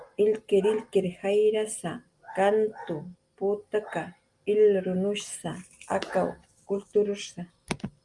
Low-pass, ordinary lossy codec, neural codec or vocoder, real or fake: 10.8 kHz; Opus, 32 kbps; none; real